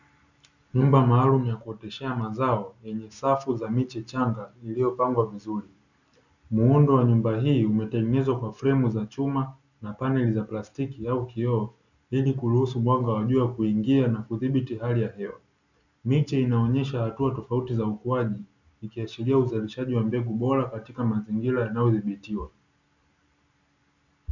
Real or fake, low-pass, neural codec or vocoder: real; 7.2 kHz; none